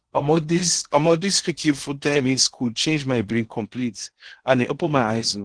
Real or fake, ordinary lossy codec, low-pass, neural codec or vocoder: fake; Opus, 16 kbps; 9.9 kHz; codec, 16 kHz in and 24 kHz out, 0.8 kbps, FocalCodec, streaming, 65536 codes